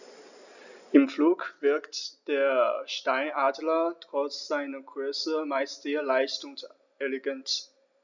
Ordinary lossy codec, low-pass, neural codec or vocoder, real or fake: none; 7.2 kHz; none; real